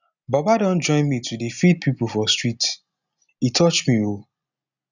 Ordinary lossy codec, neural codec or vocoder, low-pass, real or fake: none; none; 7.2 kHz; real